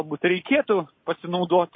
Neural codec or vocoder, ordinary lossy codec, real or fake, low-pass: none; MP3, 24 kbps; real; 7.2 kHz